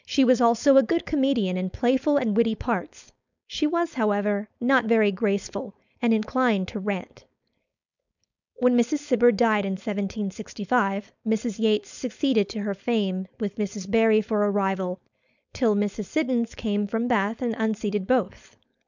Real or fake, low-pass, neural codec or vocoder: fake; 7.2 kHz; codec, 16 kHz, 4.8 kbps, FACodec